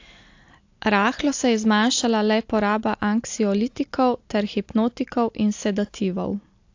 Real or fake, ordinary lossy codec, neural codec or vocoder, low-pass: real; AAC, 48 kbps; none; 7.2 kHz